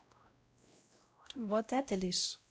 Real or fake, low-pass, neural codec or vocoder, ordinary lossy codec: fake; none; codec, 16 kHz, 0.5 kbps, X-Codec, WavLM features, trained on Multilingual LibriSpeech; none